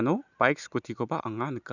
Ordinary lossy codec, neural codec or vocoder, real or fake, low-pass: none; none; real; 7.2 kHz